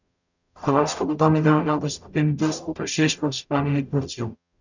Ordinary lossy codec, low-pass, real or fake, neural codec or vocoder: none; 7.2 kHz; fake; codec, 44.1 kHz, 0.9 kbps, DAC